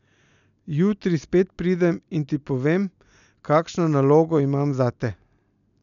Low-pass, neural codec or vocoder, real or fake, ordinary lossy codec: 7.2 kHz; none; real; none